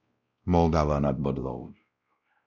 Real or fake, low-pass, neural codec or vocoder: fake; 7.2 kHz; codec, 16 kHz, 0.5 kbps, X-Codec, WavLM features, trained on Multilingual LibriSpeech